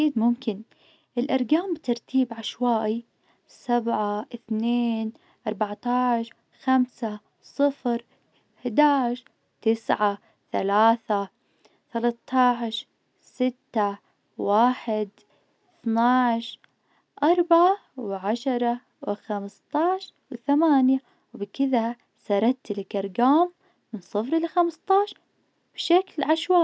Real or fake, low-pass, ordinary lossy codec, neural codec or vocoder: real; none; none; none